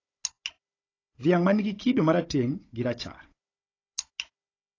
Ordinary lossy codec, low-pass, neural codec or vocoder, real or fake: AAC, 32 kbps; 7.2 kHz; codec, 16 kHz, 16 kbps, FunCodec, trained on Chinese and English, 50 frames a second; fake